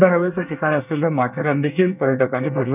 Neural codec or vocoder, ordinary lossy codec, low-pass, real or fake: codec, 24 kHz, 1 kbps, SNAC; none; 3.6 kHz; fake